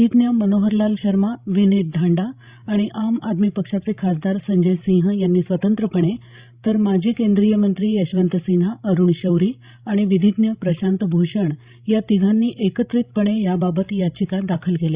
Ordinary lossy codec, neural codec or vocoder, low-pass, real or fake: Opus, 64 kbps; codec, 16 kHz, 16 kbps, FreqCodec, larger model; 3.6 kHz; fake